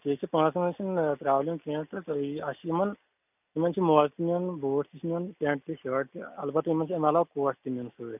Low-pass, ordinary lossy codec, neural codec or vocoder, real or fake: 3.6 kHz; none; none; real